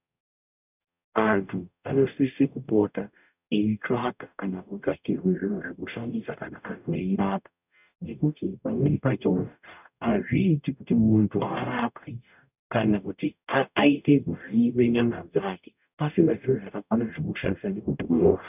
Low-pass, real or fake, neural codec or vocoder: 3.6 kHz; fake; codec, 44.1 kHz, 0.9 kbps, DAC